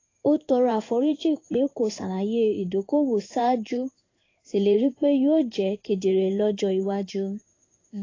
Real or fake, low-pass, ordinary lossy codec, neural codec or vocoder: fake; 7.2 kHz; AAC, 32 kbps; codec, 16 kHz in and 24 kHz out, 1 kbps, XY-Tokenizer